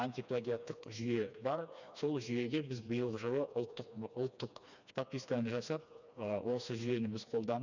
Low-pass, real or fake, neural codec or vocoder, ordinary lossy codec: 7.2 kHz; fake; codec, 16 kHz, 2 kbps, FreqCodec, smaller model; none